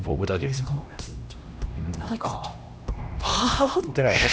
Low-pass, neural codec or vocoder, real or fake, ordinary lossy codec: none; codec, 16 kHz, 1 kbps, X-Codec, HuBERT features, trained on LibriSpeech; fake; none